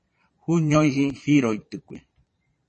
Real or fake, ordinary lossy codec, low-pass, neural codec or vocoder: fake; MP3, 32 kbps; 9.9 kHz; vocoder, 22.05 kHz, 80 mel bands, Vocos